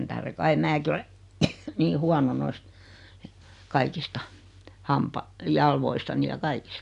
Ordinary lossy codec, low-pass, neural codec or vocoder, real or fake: none; 10.8 kHz; none; real